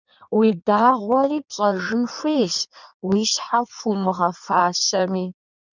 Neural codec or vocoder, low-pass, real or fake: codec, 16 kHz in and 24 kHz out, 1.1 kbps, FireRedTTS-2 codec; 7.2 kHz; fake